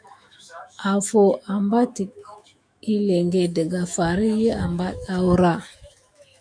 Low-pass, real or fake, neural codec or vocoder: 9.9 kHz; fake; autoencoder, 48 kHz, 128 numbers a frame, DAC-VAE, trained on Japanese speech